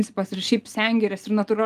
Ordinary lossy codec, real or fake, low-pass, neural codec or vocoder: Opus, 32 kbps; real; 14.4 kHz; none